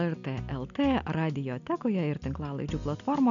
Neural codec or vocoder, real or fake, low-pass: none; real; 7.2 kHz